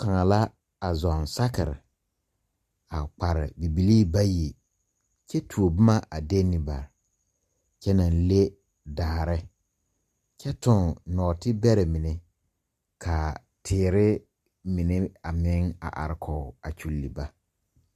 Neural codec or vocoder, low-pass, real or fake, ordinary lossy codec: none; 14.4 kHz; real; Opus, 64 kbps